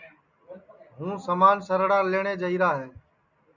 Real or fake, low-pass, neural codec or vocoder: real; 7.2 kHz; none